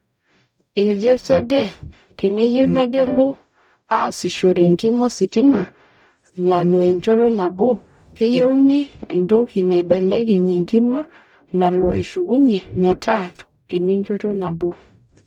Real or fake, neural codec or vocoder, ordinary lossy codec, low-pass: fake; codec, 44.1 kHz, 0.9 kbps, DAC; none; 19.8 kHz